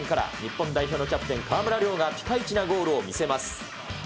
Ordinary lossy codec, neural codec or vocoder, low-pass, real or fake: none; none; none; real